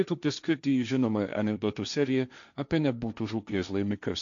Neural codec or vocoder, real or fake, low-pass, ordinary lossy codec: codec, 16 kHz, 1.1 kbps, Voila-Tokenizer; fake; 7.2 kHz; MP3, 96 kbps